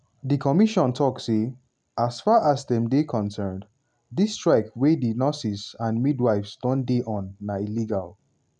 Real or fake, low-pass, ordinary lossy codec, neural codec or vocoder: real; 9.9 kHz; none; none